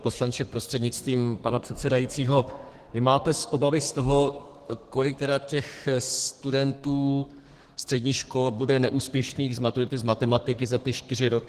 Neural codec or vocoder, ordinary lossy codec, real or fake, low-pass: codec, 32 kHz, 1.9 kbps, SNAC; Opus, 16 kbps; fake; 14.4 kHz